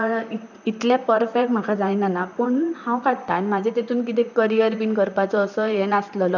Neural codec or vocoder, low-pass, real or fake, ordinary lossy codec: vocoder, 44.1 kHz, 128 mel bands, Pupu-Vocoder; 7.2 kHz; fake; none